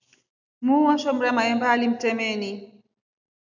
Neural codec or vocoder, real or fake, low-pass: none; real; 7.2 kHz